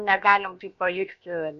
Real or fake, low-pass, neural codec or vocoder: fake; 7.2 kHz; codec, 16 kHz, about 1 kbps, DyCAST, with the encoder's durations